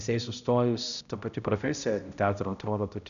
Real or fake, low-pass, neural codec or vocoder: fake; 7.2 kHz; codec, 16 kHz, 0.5 kbps, X-Codec, HuBERT features, trained on balanced general audio